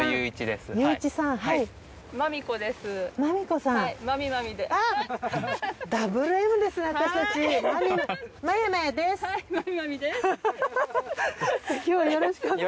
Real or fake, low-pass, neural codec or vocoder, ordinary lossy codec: real; none; none; none